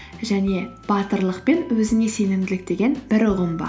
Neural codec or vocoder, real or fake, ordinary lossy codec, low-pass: none; real; none; none